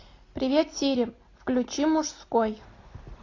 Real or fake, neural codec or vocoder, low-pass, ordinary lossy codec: real; none; 7.2 kHz; AAC, 32 kbps